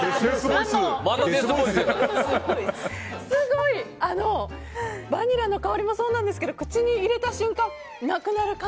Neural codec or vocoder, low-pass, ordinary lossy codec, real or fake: none; none; none; real